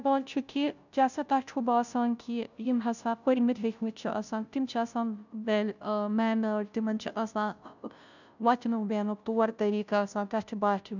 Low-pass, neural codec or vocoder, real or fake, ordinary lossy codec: 7.2 kHz; codec, 16 kHz, 0.5 kbps, FunCodec, trained on Chinese and English, 25 frames a second; fake; none